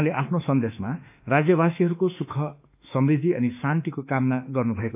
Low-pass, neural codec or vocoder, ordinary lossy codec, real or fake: 3.6 kHz; autoencoder, 48 kHz, 32 numbers a frame, DAC-VAE, trained on Japanese speech; AAC, 32 kbps; fake